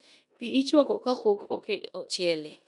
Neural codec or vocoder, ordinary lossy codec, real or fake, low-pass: codec, 16 kHz in and 24 kHz out, 0.9 kbps, LongCat-Audio-Codec, four codebook decoder; none; fake; 10.8 kHz